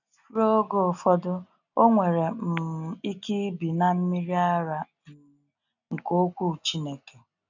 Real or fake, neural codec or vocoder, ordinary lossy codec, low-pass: real; none; none; 7.2 kHz